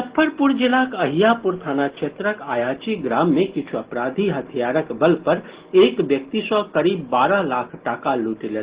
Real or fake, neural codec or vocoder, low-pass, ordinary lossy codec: real; none; 3.6 kHz; Opus, 16 kbps